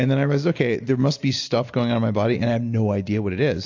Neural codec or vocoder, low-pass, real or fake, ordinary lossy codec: none; 7.2 kHz; real; AAC, 48 kbps